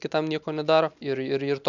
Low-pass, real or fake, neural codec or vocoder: 7.2 kHz; real; none